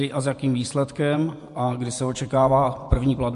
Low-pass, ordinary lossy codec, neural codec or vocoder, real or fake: 10.8 kHz; MP3, 64 kbps; vocoder, 24 kHz, 100 mel bands, Vocos; fake